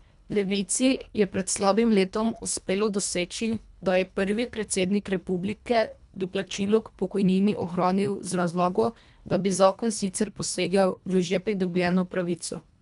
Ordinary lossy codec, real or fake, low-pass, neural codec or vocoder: none; fake; 10.8 kHz; codec, 24 kHz, 1.5 kbps, HILCodec